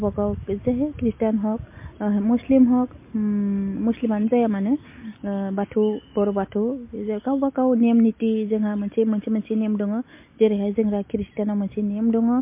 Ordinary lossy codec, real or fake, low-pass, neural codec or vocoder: MP3, 24 kbps; real; 3.6 kHz; none